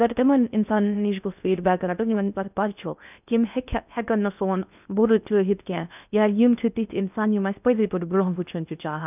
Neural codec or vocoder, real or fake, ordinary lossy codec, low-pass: codec, 16 kHz in and 24 kHz out, 0.6 kbps, FocalCodec, streaming, 4096 codes; fake; none; 3.6 kHz